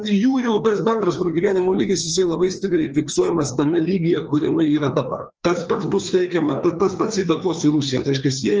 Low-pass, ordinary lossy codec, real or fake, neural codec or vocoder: 7.2 kHz; Opus, 24 kbps; fake; codec, 16 kHz, 2 kbps, FreqCodec, larger model